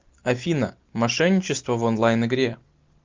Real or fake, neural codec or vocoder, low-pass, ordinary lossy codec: real; none; 7.2 kHz; Opus, 24 kbps